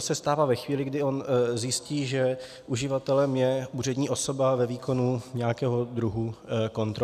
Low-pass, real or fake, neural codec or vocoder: 14.4 kHz; real; none